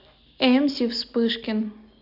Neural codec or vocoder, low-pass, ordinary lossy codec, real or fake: none; 5.4 kHz; AAC, 48 kbps; real